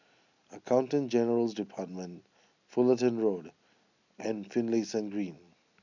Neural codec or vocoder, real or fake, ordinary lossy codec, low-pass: none; real; none; 7.2 kHz